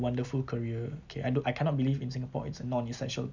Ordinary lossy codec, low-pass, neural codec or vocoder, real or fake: none; 7.2 kHz; none; real